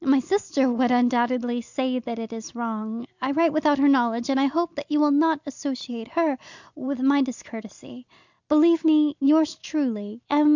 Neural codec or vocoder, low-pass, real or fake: none; 7.2 kHz; real